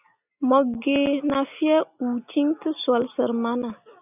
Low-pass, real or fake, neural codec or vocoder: 3.6 kHz; real; none